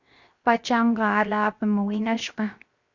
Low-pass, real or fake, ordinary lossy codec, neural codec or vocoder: 7.2 kHz; fake; Opus, 64 kbps; codec, 16 kHz, 0.7 kbps, FocalCodec